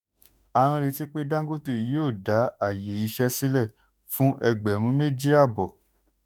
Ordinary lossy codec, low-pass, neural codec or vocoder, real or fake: none; none; autoencoder, 48 kHz, 32 numbers a frame, DAC-VAE, trained on Japanese speech; fake